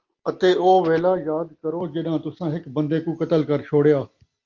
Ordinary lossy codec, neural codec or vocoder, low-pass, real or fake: Opus, 32 kbps; none; 7.2 kHz; real